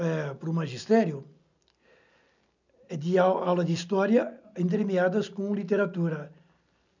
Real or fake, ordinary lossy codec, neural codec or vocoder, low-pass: real; none; none; 7.2 kHz